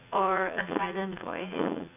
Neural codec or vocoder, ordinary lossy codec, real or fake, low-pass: vocoder, 44.1 kHz, 80 mel bands, Vocos; AAC, 32 kbps; fake; 3.6 kHz